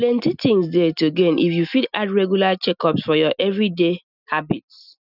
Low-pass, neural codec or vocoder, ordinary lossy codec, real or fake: 5.4 kHz; none; none; real